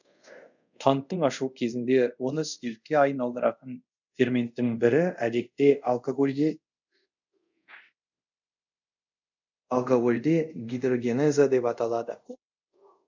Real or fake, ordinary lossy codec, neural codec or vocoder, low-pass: fake; none; codec, 24 kHz, 0.5 kbps, DualCodec; 7.2 kHz